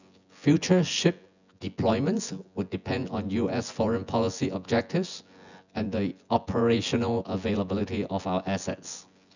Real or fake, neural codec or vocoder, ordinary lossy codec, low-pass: fake; vocoder, 24 kHz, 100 mel bands, Vocos; none; 7.2 kHz